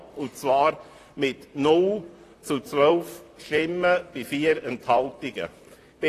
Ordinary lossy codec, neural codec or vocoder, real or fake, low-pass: AAC, 48 kbps; vocoder, 44.1 kHz, 128 mel bands, Pupu-Vocoder; fake; 14.4 kHz